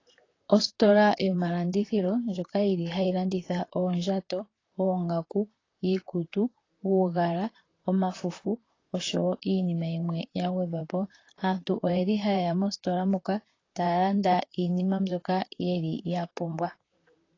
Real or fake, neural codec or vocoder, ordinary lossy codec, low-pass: fake; vocoder, 44.1 kHz, 128 mel bands, Pupu-Vocoder; AAC, 32 kbps; 7.2 kHz